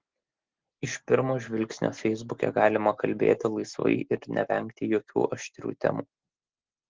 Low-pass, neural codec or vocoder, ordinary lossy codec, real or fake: 7.2 kHz; none; Opus, 16 kbps; real